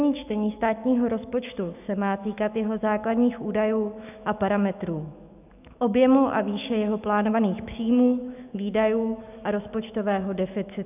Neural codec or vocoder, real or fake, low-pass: autoencoder, 48 kHz, 128 numbers a frame, DAC-VAE, trained on Japanese speech; fake; 3.6 kHz